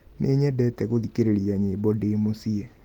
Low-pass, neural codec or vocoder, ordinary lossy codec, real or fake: 19.8 kHz; none; Opus, 24 kbps; real